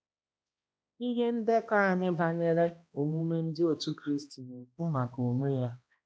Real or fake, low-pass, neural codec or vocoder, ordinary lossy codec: fake; none; codec, 16 kHz, 1 kbps, X-Codec, HuBERT features, trained on balanced general audio; none